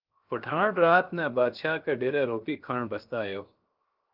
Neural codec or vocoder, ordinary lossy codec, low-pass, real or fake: codec, 16 kHz, 0.7 kbps, FocalCodec; Opus, 32 kbps; 5.4 kHz; fake